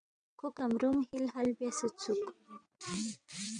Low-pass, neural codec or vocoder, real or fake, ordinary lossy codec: 9.9 kHz; vocoder, 22.05 kHz, 80 mel bands, WaveNeXt; fake; MP3, 96 kbps